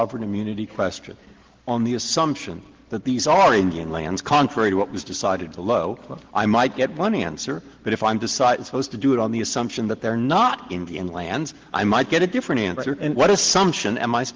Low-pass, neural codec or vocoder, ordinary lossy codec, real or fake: 7.2 kHz; none; Opus, 16 kbps; real